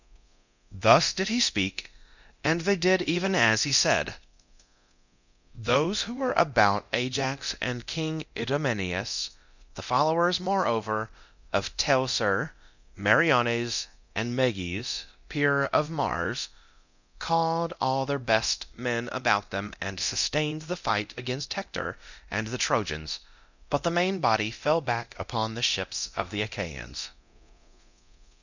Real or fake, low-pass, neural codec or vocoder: fake; 7.2 kHz; codec, 24 kHz, 0.9 kbps, DualCodec